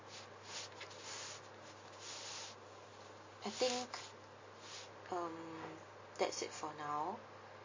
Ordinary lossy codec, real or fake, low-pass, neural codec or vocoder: MP3, 32 kbps; real; 7.2 kHz; none